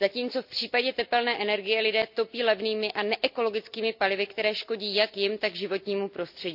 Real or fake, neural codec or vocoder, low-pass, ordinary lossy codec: real; none; 5.4 kHz; none